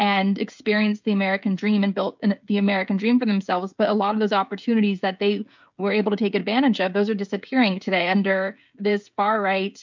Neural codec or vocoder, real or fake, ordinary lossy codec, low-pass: codec, 16 kHz, 16 kbps, FreqCodec, smaller model; fake; MP3, 64 kbps; 7.2 kHz